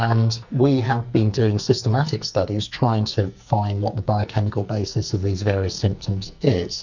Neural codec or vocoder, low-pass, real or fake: codec, 44.1 kHz, 2.6 kbps, SNAC; 7.2 kHz; fake